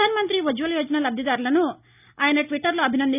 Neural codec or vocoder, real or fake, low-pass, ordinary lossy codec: none; real; 3.6 kHz; none